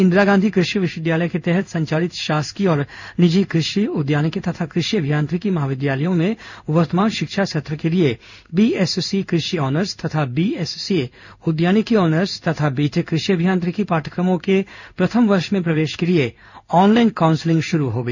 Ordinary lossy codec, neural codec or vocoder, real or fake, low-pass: none; codec, 16 kHz in and 24 kHz out, 1 kbps, XY-Tokenizer; fake; 7.2 kHz